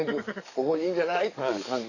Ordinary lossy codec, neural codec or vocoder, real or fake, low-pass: none; codec, 44.1 kHz, 7.8 kbps, DAC; fake; 7.2 kHz